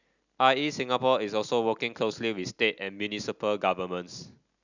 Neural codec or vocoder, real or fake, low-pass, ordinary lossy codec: none; real; 7.2 kHz; none